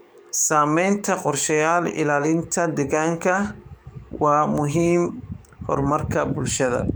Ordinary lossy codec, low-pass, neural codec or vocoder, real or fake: none; none; codec, 44.1 kHz, 7.8 kbps, DAC; fake